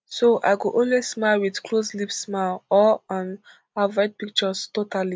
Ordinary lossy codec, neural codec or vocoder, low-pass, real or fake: none; none; none; real